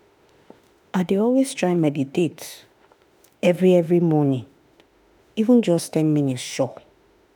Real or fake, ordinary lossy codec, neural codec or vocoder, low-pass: fake; none; autoencoder, 48 kHz, 32 numbers a frame, DAC-VAE, trained on Japanese speech; none